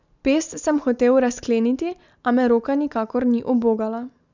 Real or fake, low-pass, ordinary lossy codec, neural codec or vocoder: real; 7.2 kHz; none; none